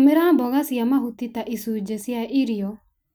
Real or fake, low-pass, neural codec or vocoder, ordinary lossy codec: real; none; none; none